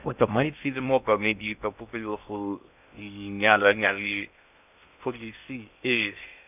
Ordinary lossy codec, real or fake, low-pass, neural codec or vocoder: none; fake; 3.6 kHz; codec, 16 kHz in and 24 kHz out, 0.6 kbps, FocalCodec, streaming, 2048 codes